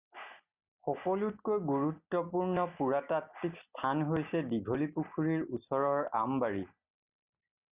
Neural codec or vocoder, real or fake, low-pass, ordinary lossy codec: none; real; 3.6 kHz; Opus, 64 kbps